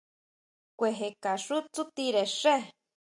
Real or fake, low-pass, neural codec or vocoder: real; 10.8 kHz; none